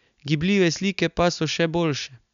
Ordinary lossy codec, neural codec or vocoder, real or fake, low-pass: none; none; real; 7.2 kHz